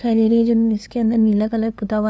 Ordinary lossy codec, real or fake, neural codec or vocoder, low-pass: none; fake; codec, 16 kHz, 2 kbps, FunCodec, trained on LibriTTS, 25 frames a second; none